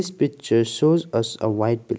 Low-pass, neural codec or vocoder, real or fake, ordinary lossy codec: none; none; real; none